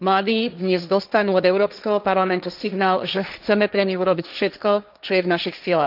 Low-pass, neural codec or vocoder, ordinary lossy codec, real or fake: 5.4 kHz; codec, 16 kHz, 1.1 kbps, Voila-Tokenizer; none; fake